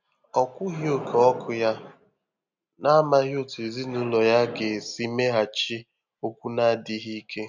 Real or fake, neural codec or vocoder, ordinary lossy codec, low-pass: real; none; none; 7.2 kHz